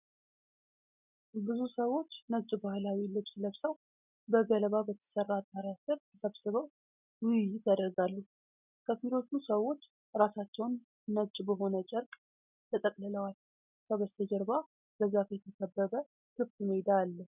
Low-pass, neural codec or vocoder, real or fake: 3.6 kHz; none; real